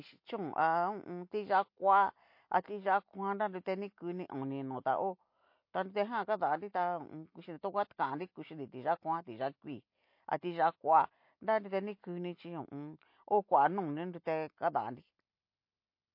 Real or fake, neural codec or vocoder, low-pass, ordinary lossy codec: real; none; 5.4 kHz; MP3, 32 kbps